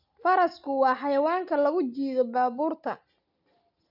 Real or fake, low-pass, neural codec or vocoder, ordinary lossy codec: real; 5.4 kHz; none; none